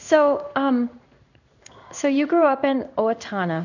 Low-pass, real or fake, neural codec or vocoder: 7.2 kHz; fake; codec, 16 kHz in and 24 kHz out, 1 kbps, XY-Tokenizer